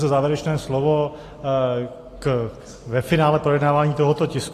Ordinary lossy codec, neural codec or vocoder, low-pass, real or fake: AAC, 48 kbps; none; 14.4 kHz; real